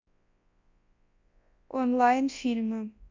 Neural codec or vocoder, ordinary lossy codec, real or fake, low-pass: codec, 24 kHz, 0.9 kbps, WavTokenizer, large speech release; MP3, 48 kbps; fake; 7.2 kHz